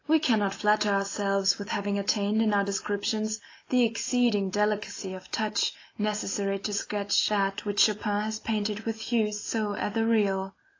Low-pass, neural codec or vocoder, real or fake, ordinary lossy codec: 7.2 kHz; none; real; AAC, 32 kbps